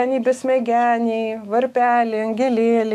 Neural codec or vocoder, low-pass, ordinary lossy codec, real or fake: autoencoder, 48 kHz, 128 numbers a frame, DAC-VAE, trained on Japanese speech; 14.4 kHz; AAC, 96 kbps; fake